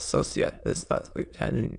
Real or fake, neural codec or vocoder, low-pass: fake; autoencoder, 22.05 kHz, a latent of 192 numbers a frame, VITS, trained on many speakers; 9.9 kHz